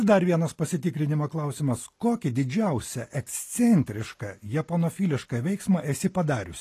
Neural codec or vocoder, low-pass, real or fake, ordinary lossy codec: none; 14.4 kHz; real; AAC, 48 kbps